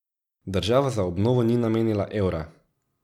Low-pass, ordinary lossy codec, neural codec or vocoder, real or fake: 19.8 kHz; none; none; real